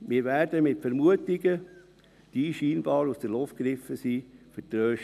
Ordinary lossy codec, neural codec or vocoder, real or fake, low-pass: none; none; real; 14.4 kHz